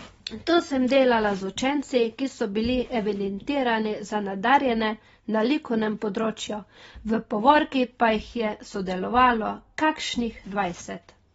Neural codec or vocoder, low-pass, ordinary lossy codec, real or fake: none; 19.8 kHz; AAC, 24 kbps; real